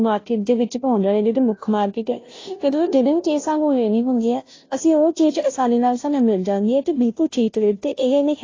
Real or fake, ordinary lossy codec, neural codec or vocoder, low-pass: fake; AAC, 32 kbps; codec, 16 kHz, 0.5 kbps, FunCodec, trained on Chinese and English, 25 frames a second; 7.2 kHz